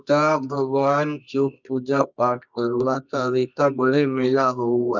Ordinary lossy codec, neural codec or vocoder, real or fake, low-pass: none; codec, 24 kHz, 0.9 kbps, WavTokenizer, medium music audio release; fake; 7.2 kHz